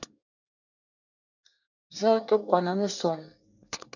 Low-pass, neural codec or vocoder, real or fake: 7.2 kHz; codec, 24 kHz, 1 kbps, SNAC; fake